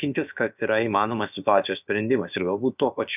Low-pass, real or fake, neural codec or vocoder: 3.6 kHz; fake; codec, 16 kHz, about 1 kbps, DyCAST, with the encoder's durations